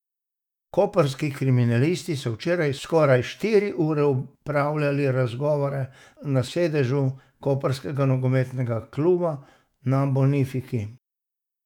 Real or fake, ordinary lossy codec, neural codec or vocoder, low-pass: fake; none; autoencoder, 48 kHz, 128 numbers a frame, DAC-VAE, trained on Japanese speech; 19.8 kHz